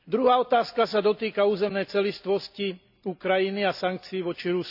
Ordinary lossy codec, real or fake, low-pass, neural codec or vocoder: none; real; 5.4 kHz; none